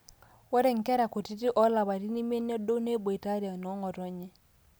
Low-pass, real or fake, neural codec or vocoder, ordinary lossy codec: none; real; none; none